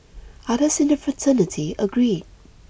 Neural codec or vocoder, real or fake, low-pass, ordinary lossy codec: none; real; none; none